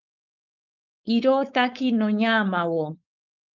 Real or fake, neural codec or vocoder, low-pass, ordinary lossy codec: fake; codec, 16 kHz, 4.8 kbps, FACodec; 7.2 kHz; Opus, 24 kbps